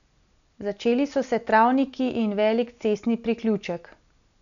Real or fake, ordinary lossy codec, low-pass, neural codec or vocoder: real; none; 7.2 kHz; none